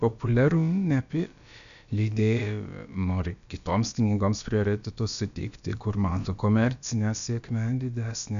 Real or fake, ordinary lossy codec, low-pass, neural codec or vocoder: fake; MP3, 96 kbps; 7.2 kHz; codec, 16 kHz, about 1 kbps, DyCAST, with the encoder's durations